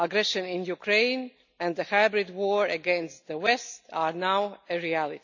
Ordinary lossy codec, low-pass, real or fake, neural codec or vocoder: none; 7.2 kHz; real; none